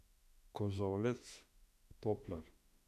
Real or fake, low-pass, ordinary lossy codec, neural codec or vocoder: fake; 14.4 kHz; none; autoencoder, 48 kHz, 32 numbers a frame, DAC-VAE, trained on Japanese speech